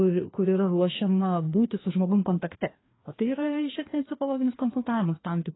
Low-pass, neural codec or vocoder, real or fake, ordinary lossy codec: 7.2 kHz; codec, 16 kHz, 2 kbps, FreqCodec, larger model; fake; AAC, 16 kbps